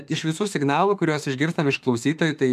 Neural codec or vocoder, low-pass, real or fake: autoencoder, 48 kHz, 32 numbers a frame, DAC-VAE, trained on Japanese speech; 14.4 kHz; fake